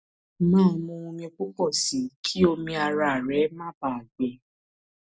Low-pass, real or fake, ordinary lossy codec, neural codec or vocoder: none; real; none; none